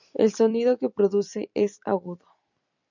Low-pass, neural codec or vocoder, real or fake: 7.2 kHz; vocoder, 44.1 kHz, 128 mel bands every 256 samples, BigVGAN v2; fake